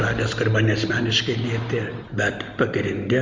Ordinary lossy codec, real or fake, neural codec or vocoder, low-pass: Opus, 32 kbps; real; none; 7.2 kHz